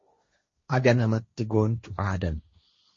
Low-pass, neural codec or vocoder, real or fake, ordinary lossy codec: 7.2 kHz; codec, 16 kHz, 1.1 kbps, Voila-Tokenizer; fake; MP3, 32 kbps